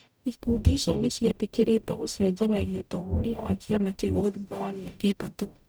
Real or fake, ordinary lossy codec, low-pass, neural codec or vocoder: fake; none; none; codec, 44.1 kHz, 0.9 kbps, DAC